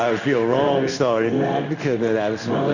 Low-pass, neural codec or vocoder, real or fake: 7.2 kHz; codec, 16 kHz in and 24 kHz out, 1 kbps, XY-Tokenizer; fake